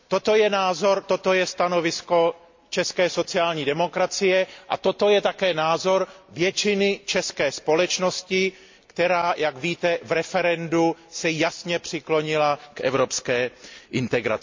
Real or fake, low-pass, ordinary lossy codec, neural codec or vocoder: real; 7.2 kHz; MP3, 48 kbps; none